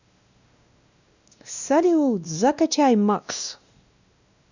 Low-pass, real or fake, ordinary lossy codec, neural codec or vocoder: 7.2 kHz; fake; none; codec, 16 kHz, 1 kbps, X-Codec, WavLM features, trained on Multilingual LibriSpeech